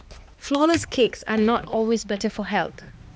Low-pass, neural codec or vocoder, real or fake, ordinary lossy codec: none; codec, 16 kHz, 2 kbps, X-Codec, HuBERT features, trained on balanced general audio; fake; none